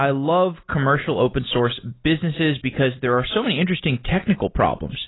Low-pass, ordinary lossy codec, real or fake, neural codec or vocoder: 7.2 kHz; AAC, 16 kbps; real; none